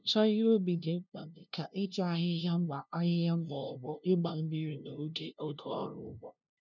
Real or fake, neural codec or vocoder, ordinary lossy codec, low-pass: fake; codec, 16 kHz, 0.5 kbps, FunCodec, trained on LibriTTS, 25 frames a second; none; 7.2 kHz